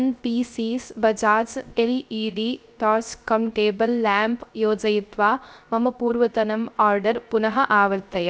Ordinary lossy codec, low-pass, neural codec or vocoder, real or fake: none; none; codec, 16 kHz, 0.3 kbps, FocalCodec; fake